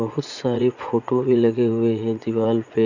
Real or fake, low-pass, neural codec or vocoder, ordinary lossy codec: fake; 7.2 kHz; vocoder, 44.1 kHz, 80 mel bands, Vocos; none